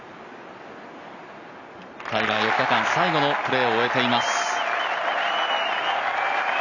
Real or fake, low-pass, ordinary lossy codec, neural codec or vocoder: real; 7.2 kHz; none; none